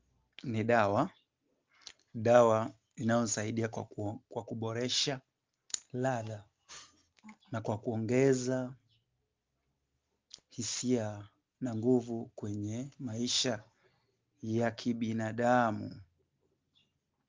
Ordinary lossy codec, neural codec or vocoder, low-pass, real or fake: Opus, 24 kbps; none; 7.2 kHz; real